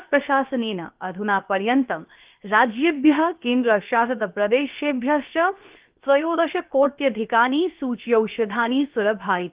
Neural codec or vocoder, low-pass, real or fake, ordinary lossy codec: codec, 16 kHz, 0.7 kbps, FocalCodec; 3.6 kHz; fake; Opus, 32 kbps